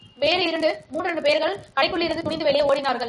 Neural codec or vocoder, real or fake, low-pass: none; real; 10.8 kHz